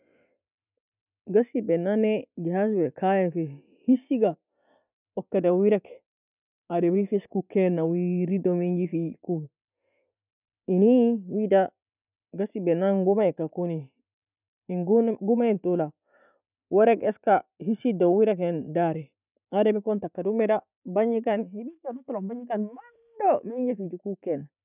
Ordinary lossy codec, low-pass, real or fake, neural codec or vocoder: none; 3.6 kHz; real; none